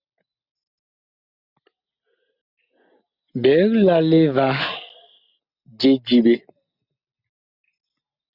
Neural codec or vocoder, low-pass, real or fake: none; 5.4 kHz; real